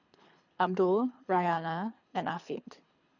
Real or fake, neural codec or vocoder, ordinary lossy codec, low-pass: fake; codec, 24 kHz, 3 kbps, HILCodec; none; 7.2 kHz